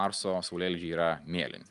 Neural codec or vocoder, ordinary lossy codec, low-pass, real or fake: none; Opus, 32 kbps; 10.8 kHz; real